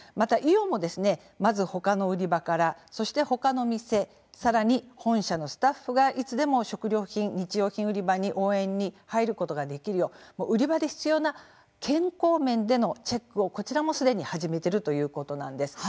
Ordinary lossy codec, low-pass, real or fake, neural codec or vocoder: none; none; real; none